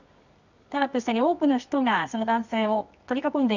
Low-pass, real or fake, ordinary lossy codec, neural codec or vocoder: 7.2 kHz; fake; none; codec, 24 kHz, 0.9 kbps, WavTokenizer, medium music audio release